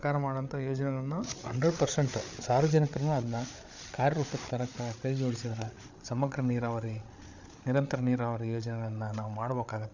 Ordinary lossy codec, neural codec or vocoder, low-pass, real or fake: none; codec, 16 kHz, 8 kbps, FreqCodec, larger model; 7.2 kHz; fake